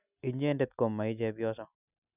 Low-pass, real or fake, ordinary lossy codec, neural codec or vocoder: 3.6 kHz; real; none; none